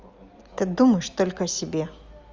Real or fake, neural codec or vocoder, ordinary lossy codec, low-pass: real; none; Opus, 32 kbps; 7.2 kHz